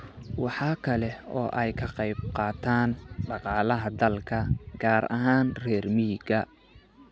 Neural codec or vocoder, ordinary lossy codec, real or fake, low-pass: none; none; real; none